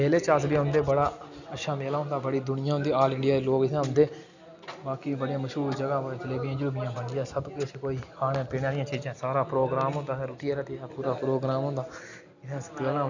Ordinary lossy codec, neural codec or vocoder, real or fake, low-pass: none; none; real; 7.2 kHz